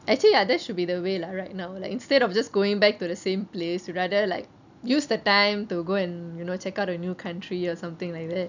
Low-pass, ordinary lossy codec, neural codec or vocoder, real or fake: 7.2 kHz; none; none; real